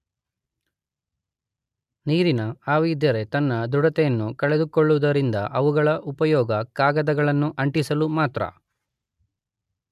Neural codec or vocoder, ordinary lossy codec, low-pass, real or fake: none; MP3, 96 kbps; 14.4 kHz; real